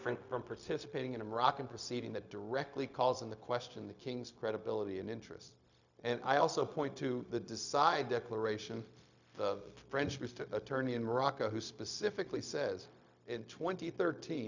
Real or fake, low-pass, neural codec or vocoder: fake; 7.2 kHz; codec, 16 kHz, 0.4 kbps, LongCat-Audio-Codec